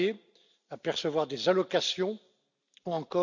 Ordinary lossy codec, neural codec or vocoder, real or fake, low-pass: none; none; real; 7.2 kHz